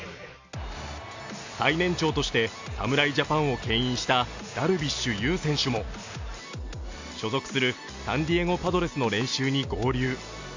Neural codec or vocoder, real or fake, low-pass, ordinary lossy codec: none; real; 7.2 kHz; AAC, 48 kbps